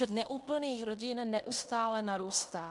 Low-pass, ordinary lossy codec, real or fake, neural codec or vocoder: 10.8 kHz; MP3, 64 kbps; fake; codec, 16 kHz in and 24 kHz out, 0.9 kbps, LongCat-Audio-Codec, fine tuned four codebook decoder